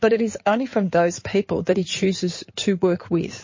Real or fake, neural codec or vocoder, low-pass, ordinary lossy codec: fake; codec, 16 kHz in and 24 kHz out, 2.2 kbps, FireRedTTS-2 codec; 7.2 kHz; MP3, 32 kbps